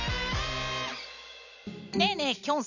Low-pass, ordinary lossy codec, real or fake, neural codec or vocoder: 7.2 kHz; none; real; none